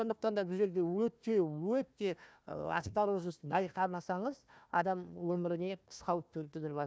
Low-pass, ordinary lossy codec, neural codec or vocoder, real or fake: none; none; codec, 16 kHz, 1 kbps, FunCodec, trained on Chinese and English, 50 frames a second; fake